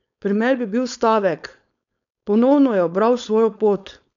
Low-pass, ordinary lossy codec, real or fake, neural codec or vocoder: 7.2 kHz; none; fake; codec, 16 kHz, 4.8 kbps, FACodec